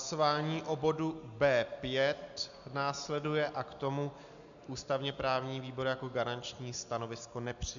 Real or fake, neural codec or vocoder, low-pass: real; none; 7.2 kHz